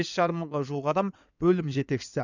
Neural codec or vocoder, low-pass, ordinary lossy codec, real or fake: codec, 16 kHz in and 24 kHz out, 2.2 kbps, FireRedTTS-2 codec; 7.2 kHz; none; fake